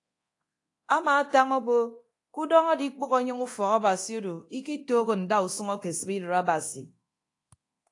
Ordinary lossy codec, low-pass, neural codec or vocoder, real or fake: AAC, 48 kbps; 10.8 kHz; codec, 24 kHz, 0.9 kbps, DualCodec; fake